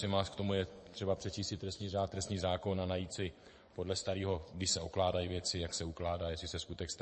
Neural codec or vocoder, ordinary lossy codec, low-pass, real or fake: vocoder, 24 kHz, 100 mel bands, Vocos; MP3, 32 kbps; 10.8 kHz; fake